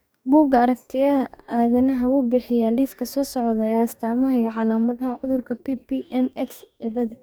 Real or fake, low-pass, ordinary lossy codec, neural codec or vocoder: fake; none; none; codec, 44.1 kHz, 2.6 kbps, DAC